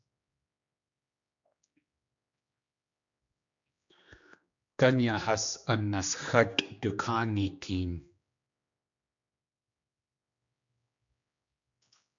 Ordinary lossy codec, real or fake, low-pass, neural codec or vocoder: MP3, 64 kbps; fake; 7.2 kHz; codec, 16 kHz, 2 kbps, X-Codec, HuBERT features, trained on general audio